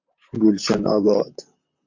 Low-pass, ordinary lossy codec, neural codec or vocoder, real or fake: 7.2 kHz; MP3, 64 kbps; vocoder, 44.1 kHz, 128 mel bands, Pupu-Vocoder; fake